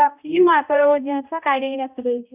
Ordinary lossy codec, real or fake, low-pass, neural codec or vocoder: none; fake; 3.6 kHz; codec, 16 kHz, 0.5 kbps, X-Codec, HuBERT features, trained on balanced general audio